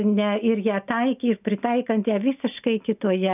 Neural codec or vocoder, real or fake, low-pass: none; real; 3.6 kHz